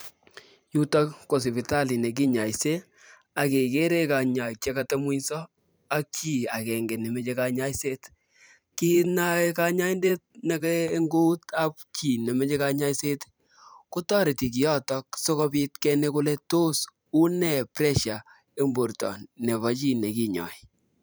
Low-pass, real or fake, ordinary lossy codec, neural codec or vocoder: none; fake; none; vocoder, 44.1 kHz, 128 mel bands every 512 samples, BigVGAN v2